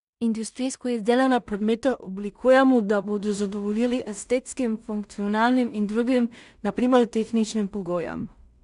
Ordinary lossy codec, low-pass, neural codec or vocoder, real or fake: none; 10.8 kHz; codec, 16 kHz in and 24 kHz out, 0.4 kbps, LongCat-Audio-Codec, two codebook decoder; fake